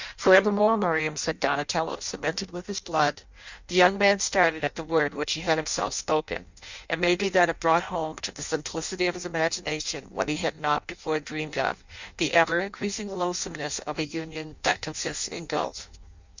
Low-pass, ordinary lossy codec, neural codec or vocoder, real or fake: 7.2 kHz; Opus, 64 kbps; codec, 16 kHz in and 24 kHz out, 0.6 kbps, FireRedTTS-2 codec; fake